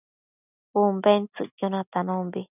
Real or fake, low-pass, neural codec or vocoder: real; 3.6 kHz; none